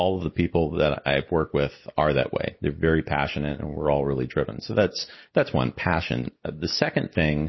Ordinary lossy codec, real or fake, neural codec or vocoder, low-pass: MP3, 24 kbps; real; none; 7.2 kHz